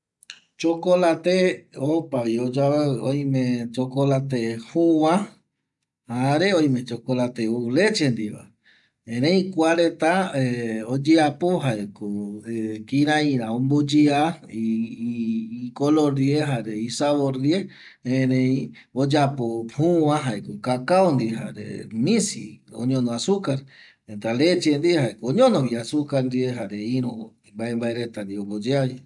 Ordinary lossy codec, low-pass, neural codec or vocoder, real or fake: none; 10.8 kHz; none; real